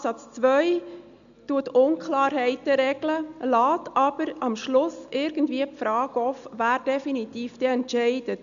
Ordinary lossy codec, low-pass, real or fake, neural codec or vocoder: none; 7.2 kHz; real; none